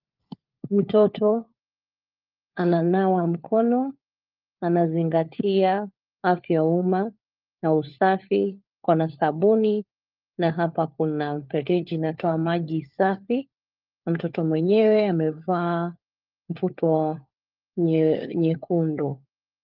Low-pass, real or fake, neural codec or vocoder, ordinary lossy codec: 5.4 kHz; fake; codec, 16 kHz, 4 kbps, FunCodec, trained on LibriTTS, 50 frames a second; Opus, 24 kbps